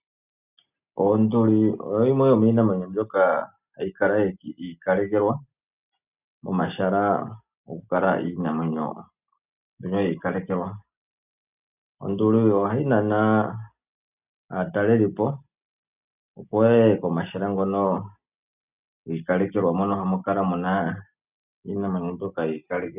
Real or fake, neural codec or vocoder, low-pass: real; none; 3.6 kHz